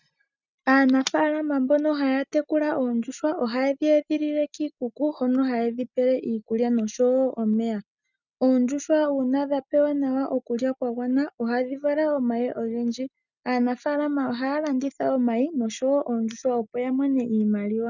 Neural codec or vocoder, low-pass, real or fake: none; 7.2 kHz; real